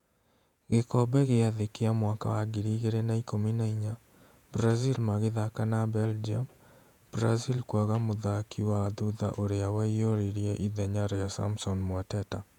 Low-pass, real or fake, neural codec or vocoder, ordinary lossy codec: 19.8 kHz; fake; vocoder, 48 kHz, 128 mel bands, Vocos; none